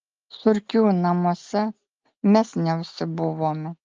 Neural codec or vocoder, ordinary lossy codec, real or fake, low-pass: none; Opus, 24 kbps; real; 7.2 kHz